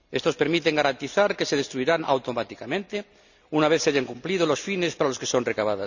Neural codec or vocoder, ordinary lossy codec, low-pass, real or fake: none; none; 7.2 kHz; real